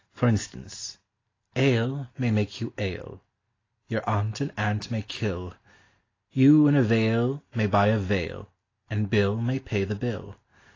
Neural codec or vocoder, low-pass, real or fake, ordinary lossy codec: none; 7.2 kHz; real; AAC, 32 kbps